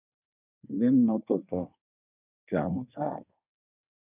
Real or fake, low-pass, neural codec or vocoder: fake; 3.6 kHz; codec, 24 kHz, 1 kbps, SNAC